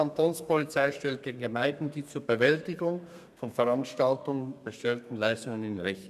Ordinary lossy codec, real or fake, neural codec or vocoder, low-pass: none; fake; codec, 32 kHz, 1.9 kbps, SNAC; 14.4 kHz